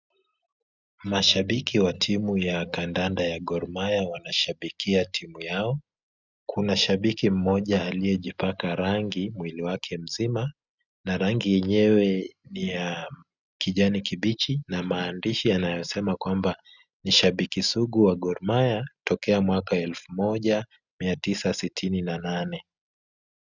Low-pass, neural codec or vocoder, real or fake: 7.2 kHz; none; real